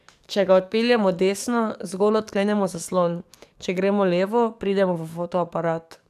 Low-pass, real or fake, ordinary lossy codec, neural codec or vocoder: 14.4 kHz; fake; none; codec, 44.1 kHz, 7.8 kbps, DAC